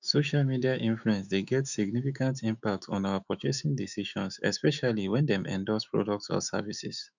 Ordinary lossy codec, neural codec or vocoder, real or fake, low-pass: none; autoencoder, 48 kHz, 128 numbers a frame, DAC-VAE, trained on Japanese speech; fake; 7.2 kHz